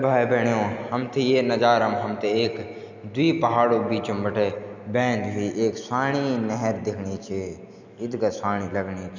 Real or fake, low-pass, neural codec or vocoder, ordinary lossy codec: real; 7.2 kHz; none; none